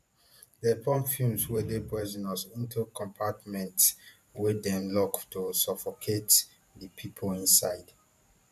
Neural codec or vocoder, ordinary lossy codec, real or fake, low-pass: vocoder, 44.1 kHz, 128 mel bands every 512 samples, BigVGAN v2; none; fake; 14.4 kHz